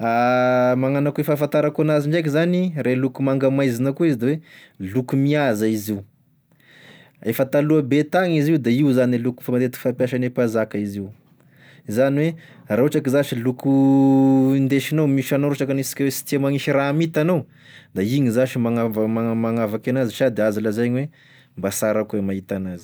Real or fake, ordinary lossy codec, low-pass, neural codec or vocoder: real; none; none; none